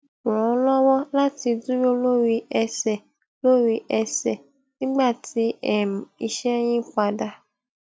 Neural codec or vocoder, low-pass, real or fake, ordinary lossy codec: none; none; real; none